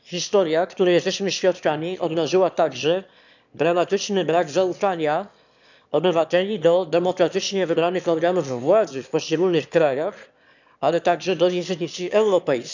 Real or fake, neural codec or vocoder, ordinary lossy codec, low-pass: fake; autoencoder, 22.05 kHz, a latent of 192 numbers a frame, VITS, trained on one speaker; none; 7.2 kHz